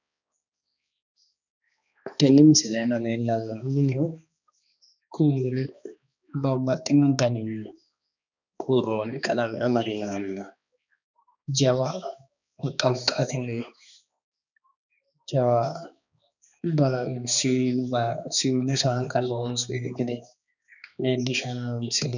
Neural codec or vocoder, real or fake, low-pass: codec, 16 kHz, 2 kbps, X-Codec, HuBERT features, trained on balanced general audio; fake; 7.2 kHz